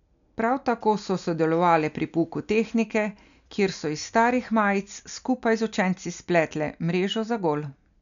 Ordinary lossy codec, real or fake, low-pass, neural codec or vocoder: none; real; 7.2 kHz; none